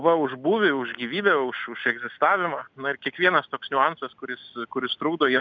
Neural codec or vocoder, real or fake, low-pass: none; real; 7.2 kHz